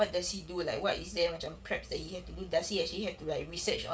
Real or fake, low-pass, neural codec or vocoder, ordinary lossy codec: fake; none; codec, 16 kHz, 16 kbps, FreqCodec, smaller model; none